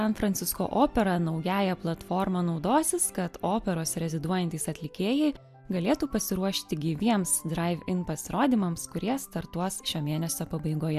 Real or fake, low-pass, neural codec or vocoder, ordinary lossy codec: real; 14.4 kHz; none; MP3, 96 kbps